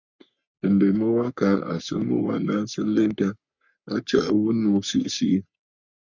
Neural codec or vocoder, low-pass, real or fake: codec, 44.1 kHz, 3.4 kbps, Pupu-Codec; 7.2 kHz; fake